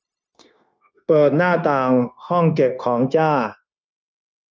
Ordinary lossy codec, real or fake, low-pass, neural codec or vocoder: none; fake; none; codec, 16 kHz, 0.9 kbps, LongCat-Audio-Codec